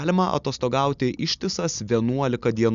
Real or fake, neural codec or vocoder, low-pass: real; none; 7.2 kHz